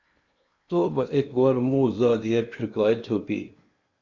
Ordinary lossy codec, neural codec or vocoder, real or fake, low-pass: Opus, 64 kbps; codec, 16 kHz in and 24 kHz out, 0.8 kbps, FocalCodec, streaming, 65536 codes; fake; 7.2 kHz